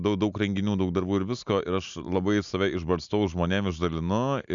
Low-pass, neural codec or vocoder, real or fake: 7.2 kHz; none; real